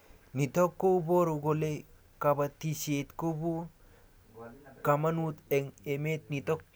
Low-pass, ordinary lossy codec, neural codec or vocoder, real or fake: none; none; none; real